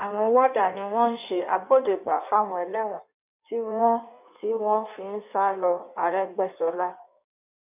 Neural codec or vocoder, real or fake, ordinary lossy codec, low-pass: codec, 16 kHz in and 24 kHz out, 1.1 kbps, FireRedTTS-2 codec; fake; none; 3.6 kHz